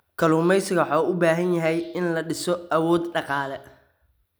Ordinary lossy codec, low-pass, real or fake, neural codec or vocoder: none; none; real; none